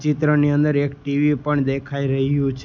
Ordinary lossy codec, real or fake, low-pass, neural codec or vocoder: none; real; 7.2 kHz; none